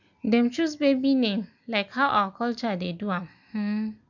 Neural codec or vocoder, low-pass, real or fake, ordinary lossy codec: none; 7.2 kHz; real; none